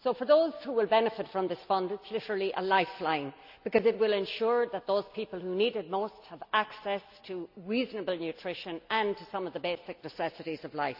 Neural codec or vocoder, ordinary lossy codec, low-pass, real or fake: none; none; 5.4 kHz; real